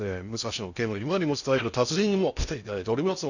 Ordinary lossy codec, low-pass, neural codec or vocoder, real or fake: none; 7.2 kHz; codec, 16 kHz in and 24 kHz out, 0.6 kbps, FocalCodec, streaming, 2048 codes; fake